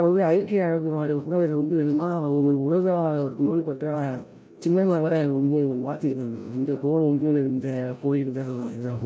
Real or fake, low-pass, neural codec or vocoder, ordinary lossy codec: fake; none; codec, 16 kHz, 0.5 kbps, FreqCodec, larger model; none